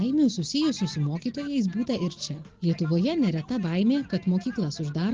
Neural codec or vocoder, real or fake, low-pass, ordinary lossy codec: none; real; 7.2 kHz; Opus, 16 kbps